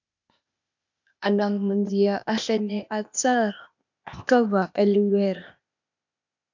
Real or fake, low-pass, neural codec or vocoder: fake; 7.2 kHz; codec, 16 kHz, 0.8 kbps, ZipCodec